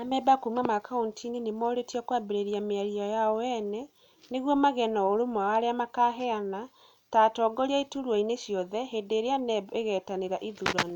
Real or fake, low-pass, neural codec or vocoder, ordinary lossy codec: real; 19.8 kHz; none; none